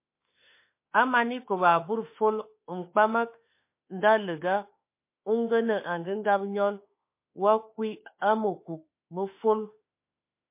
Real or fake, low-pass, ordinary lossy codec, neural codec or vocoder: fake; 3.6 kHz; MP3, 24 kbps; autoencoder, 48 kHz, 32 numbers a frame, DAC-VAE, trained on Japanese speech